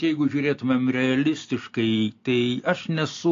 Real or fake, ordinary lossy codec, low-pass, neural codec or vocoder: real; AAC, 48 kbps; 7.2 kHz; none